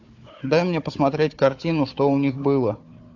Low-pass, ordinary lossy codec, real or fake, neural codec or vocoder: 7.2 kHz; AAC, 48 kbps; fake; codec, 16 kHz, 4 kbps, FunCodec, trained on Chinese and English, 50 frames a second